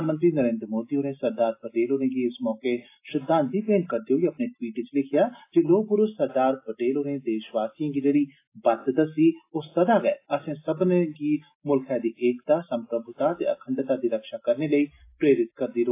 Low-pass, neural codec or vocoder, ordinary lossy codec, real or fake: 3.6 kHz; none; AAC, 24 kbps; real